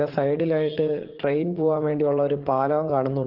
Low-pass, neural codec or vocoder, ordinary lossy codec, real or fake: 5.4 kHz; vocoder, 44.1 kHz, 80 mel bands, Vocos; Opus, 16 kbps; fake